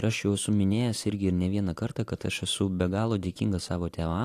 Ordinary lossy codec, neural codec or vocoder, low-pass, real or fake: AAC, 64 kbps; none; 14.4 kHz; real